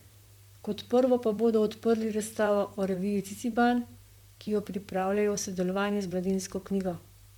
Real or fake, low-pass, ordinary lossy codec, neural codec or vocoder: fake; 19.8 kHz; MP3, 96 kbps; vocoder, 44.1 kHz, 128 mel bands, Pupu-Vocoder